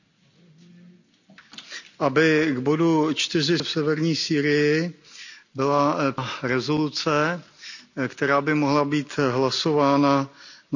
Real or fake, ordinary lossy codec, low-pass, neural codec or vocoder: real; none; 7.2 kHz; none